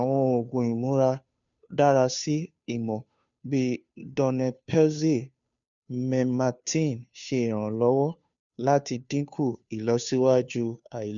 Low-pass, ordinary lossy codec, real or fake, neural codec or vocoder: 7.2 kHz; none; fake; codec, 16 kHz, 2 kbps, FunCodec, trained on Chinese and English, 25 frames a second